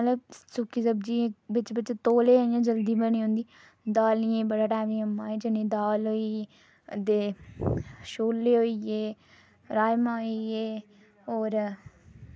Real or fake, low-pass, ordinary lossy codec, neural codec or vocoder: real; none; none; none